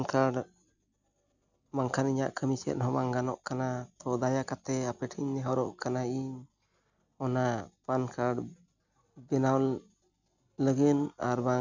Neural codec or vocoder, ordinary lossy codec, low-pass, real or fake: none; none; 7.2 kHz; real